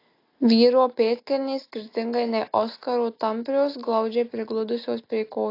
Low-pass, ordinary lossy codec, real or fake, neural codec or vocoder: 5.4 kHz; AAC, 32 kbps; real; none